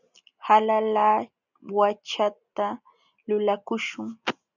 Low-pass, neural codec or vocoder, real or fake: 7.2 kHz; none; real